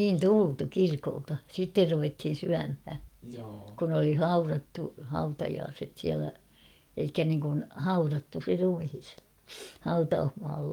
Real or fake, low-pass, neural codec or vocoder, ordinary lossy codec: fake; 19.8 kHz; codec, 44.1 kHz, 7.8 kbps, DAC; Opus, 32 kbps